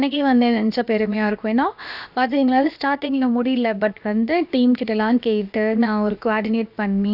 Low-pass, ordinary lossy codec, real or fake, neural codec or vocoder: 5.4 kHz; none; fake; codec, 16 kHz, about 1 kbps, DyCAST, with the encoder's durations